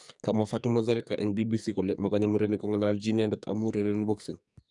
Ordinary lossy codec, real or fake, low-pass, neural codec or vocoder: none; fake; 10.8 kHz; codec, 44.1 kHz, 2.6 kbps, SNAC